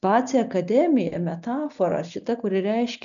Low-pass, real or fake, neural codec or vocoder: 7.2 kHz; real; none